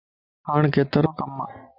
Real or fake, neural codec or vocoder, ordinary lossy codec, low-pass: real; none; Opus, 64 kbps; 5.4 kHz